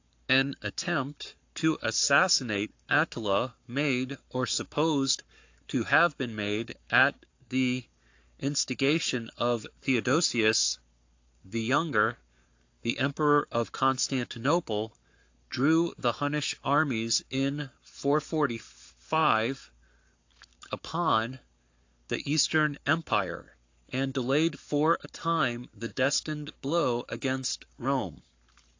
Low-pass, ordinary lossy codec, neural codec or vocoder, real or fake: 7.2 kHz; AAC, 48 kbps; codec, 44.1 kHz, 7.8 kbps, Pupu-Codec; fake